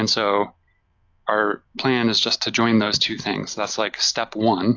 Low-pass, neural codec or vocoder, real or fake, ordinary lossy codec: 7.2 kHz; none; real; AAC, 48 kbps